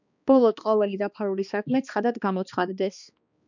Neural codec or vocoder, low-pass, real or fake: codec, 16 kHz, 2 kbps, X-Codec, HuBERT features, trained on balanced general audio; 7.2 kHz; fake